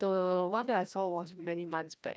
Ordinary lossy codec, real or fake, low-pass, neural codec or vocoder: none; fake; none; codec, 16 kHz, 1 kbps, FreqCodec, larger model